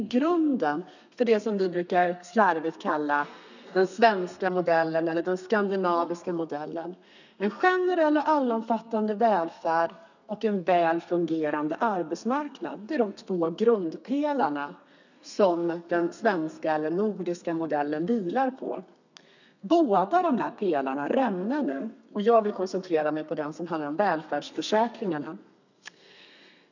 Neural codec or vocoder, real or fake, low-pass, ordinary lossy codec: codec, 32 kHz, 1.9 kbps, SNAC; fake; 7.2 kHz; none